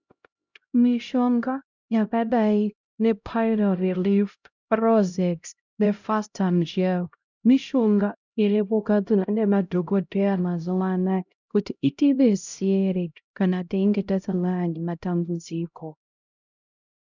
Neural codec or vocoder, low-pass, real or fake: codec, 16 kHz, 0.5 kbps, X-Codec, HuBERT features, trained on LibriSpeech; 7.2 kHz; fake